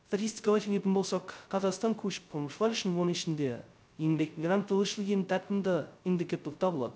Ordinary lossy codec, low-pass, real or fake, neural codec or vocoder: none; none; fake; codec, 16 kHz, 0.2 kbps, FocalCodec